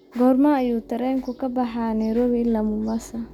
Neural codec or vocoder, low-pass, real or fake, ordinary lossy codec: none; 19.8 kHz; real; none